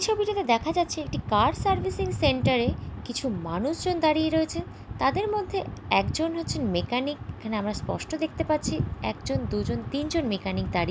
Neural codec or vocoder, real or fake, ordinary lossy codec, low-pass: none; real; none; none